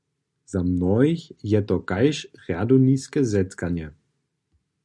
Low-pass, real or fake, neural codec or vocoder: 10.8 kHz; real; none